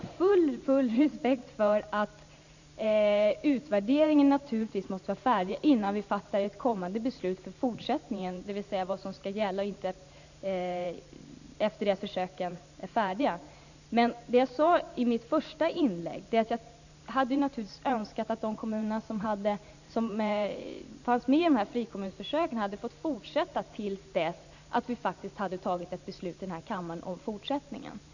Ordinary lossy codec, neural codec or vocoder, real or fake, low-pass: none; vocoder, 44.1 kHz, 128 mel bands every 512 samples, BigVGAN v2; fake; 7.2 kHz